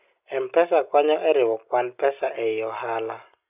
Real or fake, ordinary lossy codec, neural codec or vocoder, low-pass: real; none; none; 3.6 kHz